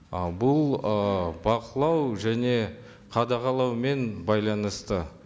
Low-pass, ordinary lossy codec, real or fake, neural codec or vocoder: none; none; real; none